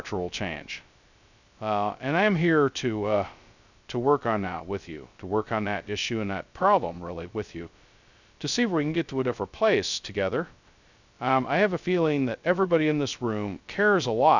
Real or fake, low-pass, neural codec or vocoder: fake; 7.2 kHz; codec, 16 kHz, 0.2 kbps, FocalCodec